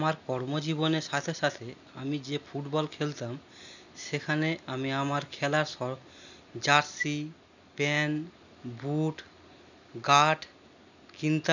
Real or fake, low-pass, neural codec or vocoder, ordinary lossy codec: real; 7.2 kHz; none; none